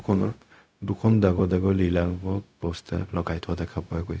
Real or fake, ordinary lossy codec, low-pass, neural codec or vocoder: fake; none; none; codec, 16 kHz, 0.4 kbps, LongCat-Audio-Codec